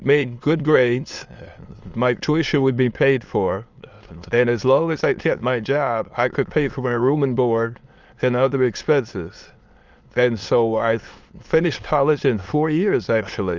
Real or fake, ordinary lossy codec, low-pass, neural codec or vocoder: fake; Opus, 32 kbps; 7.2 kHz; autoencoder, 22.05 kHz, a latent of 192 numbers a frame, VITS, trained on many speakers